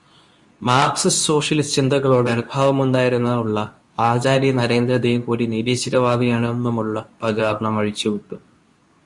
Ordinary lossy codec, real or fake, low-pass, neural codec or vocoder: Opus, 64 kbps; fake; 10.8 kHz; codec, 24 kHz, 0.9 kbps, WavTokenizer, medium speech release version 2